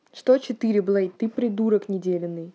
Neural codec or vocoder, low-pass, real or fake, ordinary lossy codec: none; none; real; none